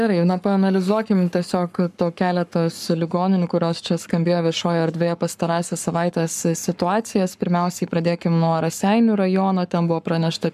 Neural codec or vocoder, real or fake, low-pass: codec, 44.1 kHz, 7.8 kbps, Pupu-Codec; fake; 14.4 kHz